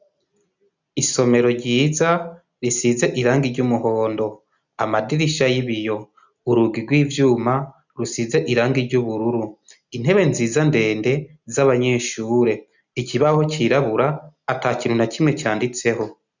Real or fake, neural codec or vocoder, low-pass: real; none; 7.2 kHz